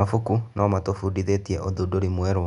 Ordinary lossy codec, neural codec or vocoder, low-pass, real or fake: none; none; 10.8 kHz; real